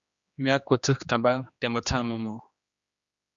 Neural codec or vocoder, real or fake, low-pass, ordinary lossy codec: codec, 16 kHz, 2 kbps, X-Codec, HuBERT features, trained on general audio; fake; 7.2 kHz; Opus, 64 kbps